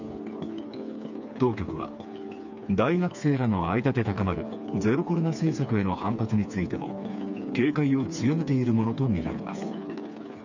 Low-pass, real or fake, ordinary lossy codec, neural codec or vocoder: 7.2 kHz; fake; none; codec, 16 kHz, 4 kbps, FreqCodec, smaller model